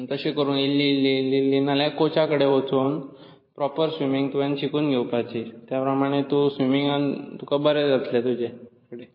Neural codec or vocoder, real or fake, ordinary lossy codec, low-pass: vocoder, 44.1 kHz, 128 mel bands every 512 samples, BigVGAN v2; fake; MP3, 24 kbps; 5.4 kHz